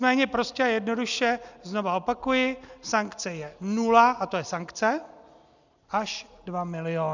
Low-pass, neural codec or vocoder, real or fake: 7.2 kHz; none; real